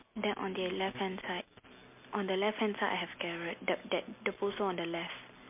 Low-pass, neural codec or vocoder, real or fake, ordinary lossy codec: 3.6 kHz; none; real; MP3, 32 kbps